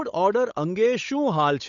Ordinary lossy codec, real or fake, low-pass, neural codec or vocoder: none; fake; 7.2 kHz; codec, 16 kHz, 8 kbps, FunCodec, trained on Chinese and English, 25 frames a second